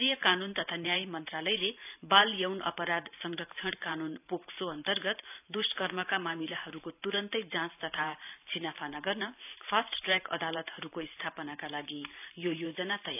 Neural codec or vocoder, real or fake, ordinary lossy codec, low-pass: vocoder, 44.1 kHz, 128 mel bands every 512 samples, BigVGAN v2; fake; none; 3.6 kHz